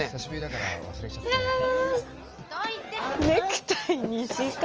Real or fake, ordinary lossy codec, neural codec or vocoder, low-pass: real; Opus, 24 kbps; none; 7.2 kHz